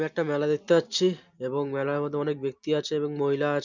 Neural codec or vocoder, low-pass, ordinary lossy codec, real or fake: none; 7.2 kHz; none; real